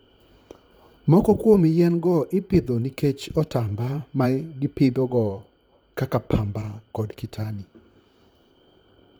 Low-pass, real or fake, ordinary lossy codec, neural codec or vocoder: none; fake; none; vocoder, 44.1 kHz, 128 mel bands, Pupu-Vocoder